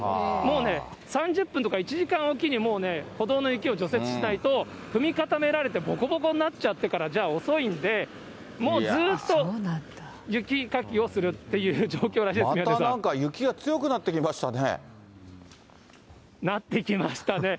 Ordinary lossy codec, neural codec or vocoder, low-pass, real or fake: none; none; none; real